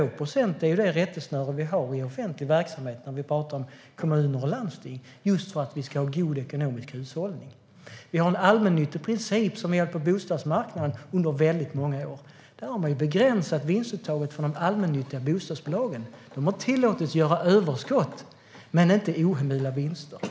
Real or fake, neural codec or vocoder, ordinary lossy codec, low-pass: real; none; none; none